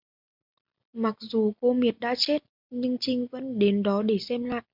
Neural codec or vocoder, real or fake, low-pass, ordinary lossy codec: none; real; 5.4 kHz; MP3, 48 kbps